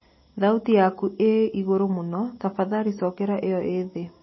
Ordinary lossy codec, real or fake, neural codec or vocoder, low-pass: MP3, 24 kbps; real; none; 7.2 kHz